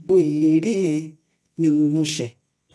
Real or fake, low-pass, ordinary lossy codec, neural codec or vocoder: fake; none; none; codec, 24 kHz, 0.9 kbps, WavTokenizer, medium music audio release